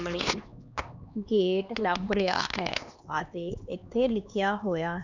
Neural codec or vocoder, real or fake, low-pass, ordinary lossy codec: codec, 16 kHz, 2 kbps, X-Codec, HuBERT features, trained on LibriSpeech; fake; 7.2 kHz; none